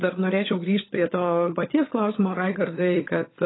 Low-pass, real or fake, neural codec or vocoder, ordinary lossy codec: 7.2 kHz; fake; codec, 16 kHz, 16 kbps, FunCodec, trained on LibriTTS, 50 frames a second; AAC, 16 kbps